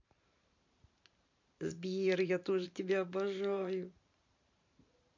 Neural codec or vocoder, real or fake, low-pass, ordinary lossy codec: vocoder, 44.1 kHz, 128 mel bands every 512 samples, BigVGAN v2; fake; 7.2 kHz; MP3, 48 kbps